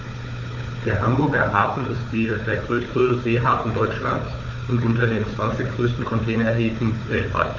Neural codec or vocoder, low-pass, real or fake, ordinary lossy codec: codec, 16 kHz, 4 kbps, FunCodec, trained on Chinese and English, 50 frames a second; 7.2 kHz; fake; AAC, 48 kbps